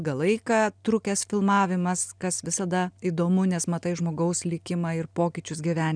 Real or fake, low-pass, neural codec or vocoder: real; 9.9 kHz; none